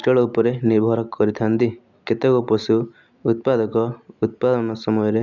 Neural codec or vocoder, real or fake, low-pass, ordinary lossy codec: none; real; 7.2 kHz; none